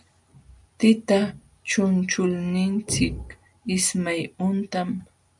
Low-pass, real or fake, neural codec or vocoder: 10.8 kHz; real; none